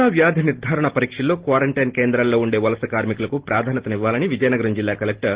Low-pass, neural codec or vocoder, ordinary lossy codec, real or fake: 3.6 kHz; none; Opus, 16 kbps; real